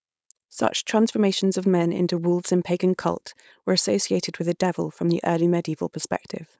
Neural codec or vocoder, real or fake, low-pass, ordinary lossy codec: codec, 16 kHz, 4.8 kbps, FACodec; fake; none; none